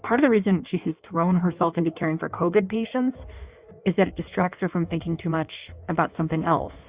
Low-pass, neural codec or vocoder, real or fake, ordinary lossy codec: 3.6 kHz; codec, 16 kHz in and 24 kHz out, 1.1 kbps, FireRedTTS-2 codec; fake; Opus, 64 kbps